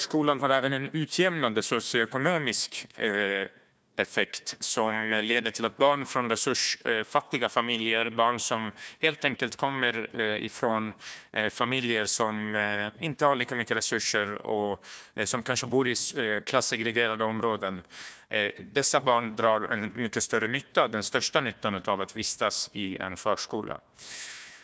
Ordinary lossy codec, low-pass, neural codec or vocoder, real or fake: none; none; codec, 16 kHz, 1 kbps, FunCodec, trained on Chinese and English, 50 frames a second; fake